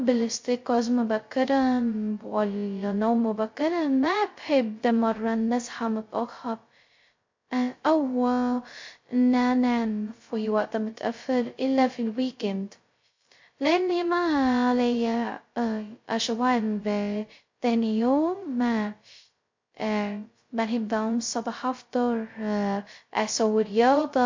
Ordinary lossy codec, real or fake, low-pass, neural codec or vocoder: MP3, 48 kbps; fake; 7.2 kHz; codec, 16 kHz, 0.2 kbps, FocalCodec